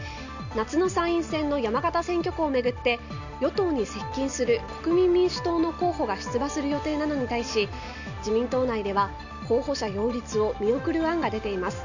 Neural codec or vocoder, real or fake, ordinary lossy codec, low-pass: none; real; none; 7.2 kHz